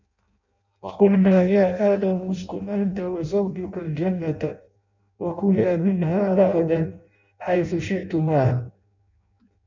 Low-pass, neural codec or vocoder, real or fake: 7.2 kHz; codec, 16 kHz in and 24 kHz out, 0.6 kbps, FireRedTTS-2 codec; fake